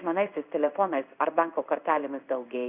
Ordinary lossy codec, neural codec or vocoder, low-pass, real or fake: Opus, 64 kbps; codec, 16 kHz in and 24 kHz out, 1 kbps, XY-Tokenizer; 3.6 kHz; fake